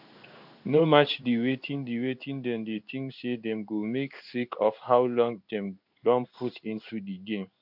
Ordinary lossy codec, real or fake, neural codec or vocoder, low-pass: none; fake; codec, 16 kHz in and 24 kHz out, 1 kbps, XY-Tokenizer; 5.4 kHz